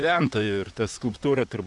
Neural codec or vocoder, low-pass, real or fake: vocoder, 44.1 kHz, 128 mel bands, Pupu-Vocoder; 10.8 kHz; fake